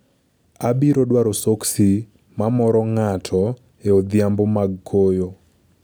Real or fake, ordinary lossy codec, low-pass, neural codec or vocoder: real; none; none; none